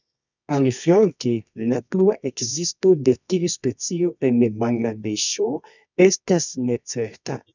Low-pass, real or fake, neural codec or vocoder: 7.2 kHz; fake; codec, 24 kHz, 0.9 kbps, WavTokenizer, medium music audio release